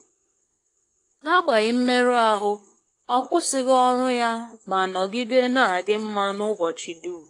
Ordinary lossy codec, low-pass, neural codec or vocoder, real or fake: AAC, 48 kbps; 10.8 kHz; codec, 24 kHz, 1 kbps, SNAC; fake